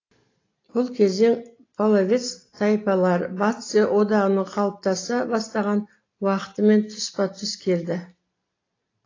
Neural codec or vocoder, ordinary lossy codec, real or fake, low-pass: none; AAC, 32 kbps; real; 7.2 kHz